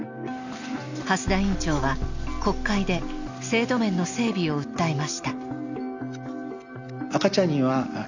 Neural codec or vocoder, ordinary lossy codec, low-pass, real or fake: none; none; 7.2 kHz; real